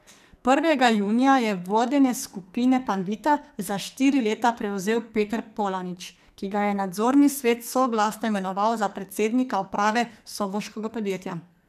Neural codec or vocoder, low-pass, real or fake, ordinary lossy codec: codec, 44.1 kHz, 2.6 kbps, SNAC; 14.4 kHz; fake; none